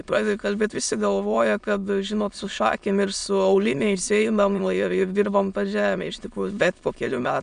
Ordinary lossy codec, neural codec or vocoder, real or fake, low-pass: MP3, 96 kbps; autoencoder, 22.05 kHz, a latent of 192 numbers a frame, VITS, trained on many speakers; fake; 9.9 kHz